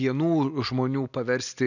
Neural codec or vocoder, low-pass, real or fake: none; 7.2 kHz; real